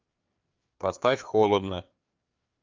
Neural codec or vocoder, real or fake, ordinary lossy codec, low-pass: codec, 16 kHz, 4 kbps, FreqCodec, larger model; fake; Opus, 24 kbps; 7.2 kHz